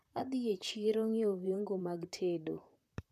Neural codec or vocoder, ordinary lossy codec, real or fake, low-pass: vocoder, 44.1 kHz, 128 mel bands, Pupu-Vocoder; none; fake; 14.4 kHz